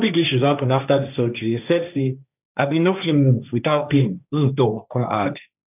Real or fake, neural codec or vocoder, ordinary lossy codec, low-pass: fake; codec, 16 kHz, 1.1 kbps, Voila-Tokenizer; none; 3.6 kHz